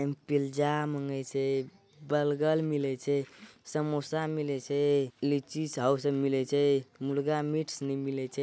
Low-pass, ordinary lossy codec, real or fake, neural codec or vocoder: none; none; real; none